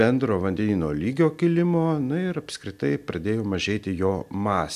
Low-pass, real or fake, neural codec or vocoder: 14.4 kHz; real; none